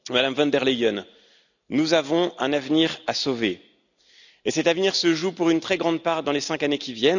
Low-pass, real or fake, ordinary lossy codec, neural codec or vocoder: 7.2 kHz; real; none; none